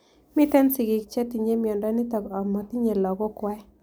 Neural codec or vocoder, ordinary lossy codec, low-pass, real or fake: none; none; none; real